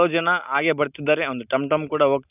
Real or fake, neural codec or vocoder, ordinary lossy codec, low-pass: real; none; none; 3.6 kHz